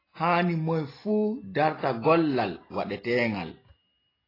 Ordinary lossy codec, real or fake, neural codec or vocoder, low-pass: AAC, 24 kbps; real; none; 5.4 kHz